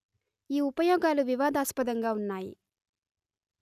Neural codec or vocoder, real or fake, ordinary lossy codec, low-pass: none; real; none; 14.4 kHz